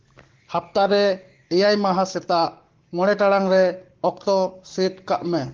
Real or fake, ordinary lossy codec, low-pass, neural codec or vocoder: fake; Opus, 16 kbps; 7.2 kHz; codec, 44.1 kHz, 7.8 kbps, Pupu-Codec